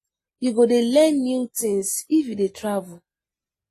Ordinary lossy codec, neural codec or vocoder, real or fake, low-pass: AAC, 48 kbps; none; real; 14.4 kHz